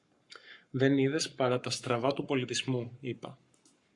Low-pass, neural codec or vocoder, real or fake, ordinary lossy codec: 10.8 kHz; codec, 44.1 kHz, 7.8 kbps, Pupu-Codec; fake; Opus, 64 kbps